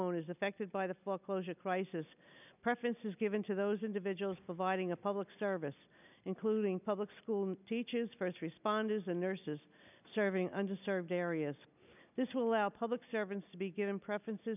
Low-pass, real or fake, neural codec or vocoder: 3.6 kHz; real; none